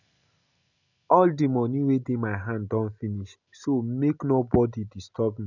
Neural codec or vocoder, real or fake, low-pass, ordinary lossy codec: none; real; 7.2 kHz; none